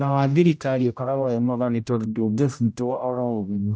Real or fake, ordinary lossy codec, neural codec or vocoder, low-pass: fake; none; codec, 16 kHz, 0.5 kbps, X-Codec, HuBERT features, trained on general audio; none